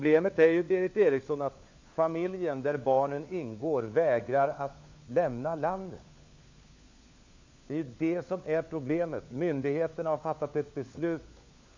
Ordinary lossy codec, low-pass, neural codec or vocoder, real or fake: MP3, 64 kbps; 7.2 kHz; codec, 16 kHz, 4 kbps, FunCodec, trained on LibriTTS, 50 frames a second; fake